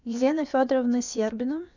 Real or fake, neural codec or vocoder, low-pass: fake; codec, 16 kHz, about 1 kbps, DyCAST, with the encoder's durations; 7.2 kHz